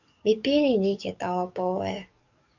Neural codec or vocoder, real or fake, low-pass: codec, 44.1 kHz, 7.8 kbps, DAC; fake; 7.2 kHz